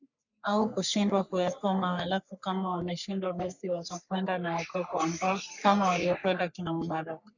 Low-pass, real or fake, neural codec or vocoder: 7.2 kHz; fake; codec, 44.1 kHz, 3.4 kbps, Pupu-Codec